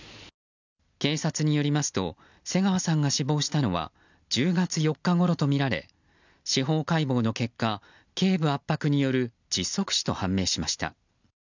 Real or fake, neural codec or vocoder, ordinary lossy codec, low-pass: real; none; none; 7.2 kHz